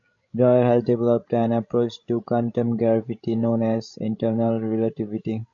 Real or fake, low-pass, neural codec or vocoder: fake; 7.2 kHz; codec, 16 kHz, 16 kbps, FreqCodec, larger model